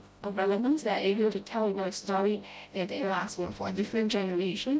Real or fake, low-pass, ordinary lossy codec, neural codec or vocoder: fake; none; none; codec, 16 kHz, 0.5 kbps, FreqCodec, smaller model